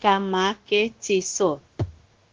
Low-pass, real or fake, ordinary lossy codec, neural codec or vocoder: 7.2 kHz; fake; Opus, 16 kbps; codec, 16 kHz, 0.9 kbps, LongCat-Audio-Codec